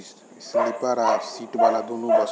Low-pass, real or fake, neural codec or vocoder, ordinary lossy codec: none; real; none; none